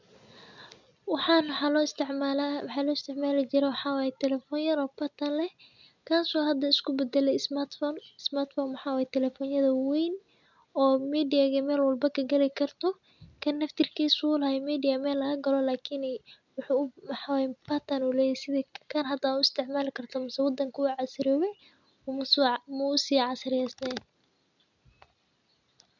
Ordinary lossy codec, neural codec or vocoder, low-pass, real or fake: none; none; 7.2 kHz; real